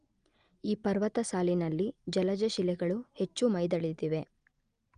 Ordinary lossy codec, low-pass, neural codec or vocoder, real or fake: Opus, 32 kbps; 9.9 kHz; none; real